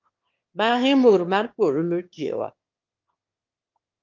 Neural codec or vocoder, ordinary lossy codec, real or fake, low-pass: autoencoder, 22.05 kHz, a latent of 192 numbers a frame, VITS, trained on one speaker; Opus, 32 kbps; fake; 7.2 kHz